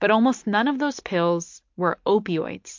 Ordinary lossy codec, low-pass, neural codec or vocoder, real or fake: MP3, 48 kbps; 7.2 kHz; none; real